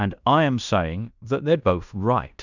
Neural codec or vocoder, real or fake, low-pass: codec, 16 kHz in and 24 kHz out, 0.9 kbps, LongCat-Audio-Codec, fine tuned four codebook decoder; fake; 7.2 kHz